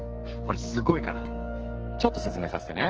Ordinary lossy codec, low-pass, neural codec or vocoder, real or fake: Opus, 16 kbps; 7.2 kHz; codec, 44.1 kHz, 2.6 kbps, SNAC; fake